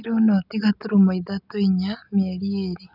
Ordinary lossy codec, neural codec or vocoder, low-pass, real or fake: none; none; 5.4 kHz; real